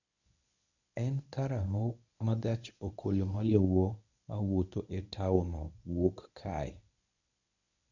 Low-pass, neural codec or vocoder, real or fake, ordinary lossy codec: 7.2 kHz; codec, 24 kHz, 0.9 kbps, WavTokenizer, medium speech release version 1; fake; none